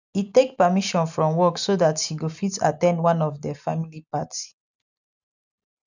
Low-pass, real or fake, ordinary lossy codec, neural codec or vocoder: 7.2 kHz; real; none; none